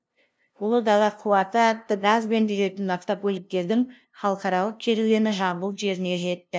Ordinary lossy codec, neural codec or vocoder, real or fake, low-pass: none; codec, 16 kHz, 0.5 kbps, FunCodec, trained on LibriTTS, 25 frames a second; fake; none